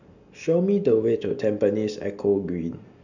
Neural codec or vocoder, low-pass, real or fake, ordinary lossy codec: none; 7.2 kHz; real; none